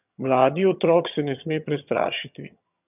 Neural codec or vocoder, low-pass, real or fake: vocoder, 22.05 kHz, 80 mel bands, HiFi-GAN; 3.6 kHz; fake